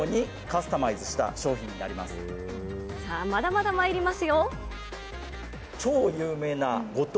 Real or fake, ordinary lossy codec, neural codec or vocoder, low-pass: real; none; none; none